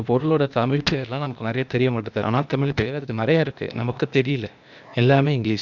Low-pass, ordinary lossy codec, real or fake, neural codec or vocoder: 7.2 kHz; none; fake; codec, 16 kHz, 0.8 kbps, ZipCodec